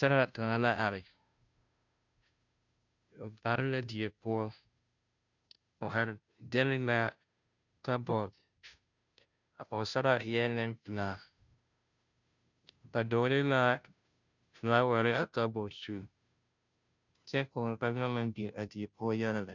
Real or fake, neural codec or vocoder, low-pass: fake; codec, 16 kHz, 0.5 kbps, FunCodec, trained on Chinese and English, 25 frames a second; 7.2 kHz